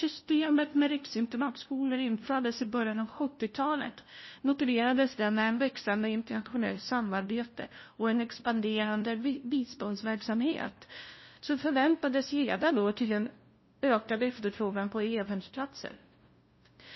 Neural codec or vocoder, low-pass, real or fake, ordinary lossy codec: codec, 16 kHz, 0.5 kbps, FunCodec, trained on LibriTTS, 25 frames a second; 7.2 kHz; fake; MP3, 24 kbps